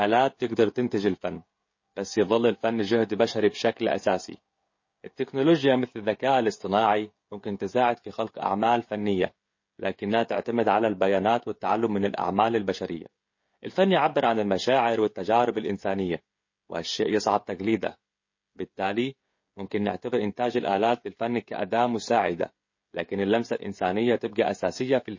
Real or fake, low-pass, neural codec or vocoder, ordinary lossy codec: fake; 7.2 kHz; codec, 16 kHz, 16 kbps, FreqCodec, smaller model; MP3, 32 kbps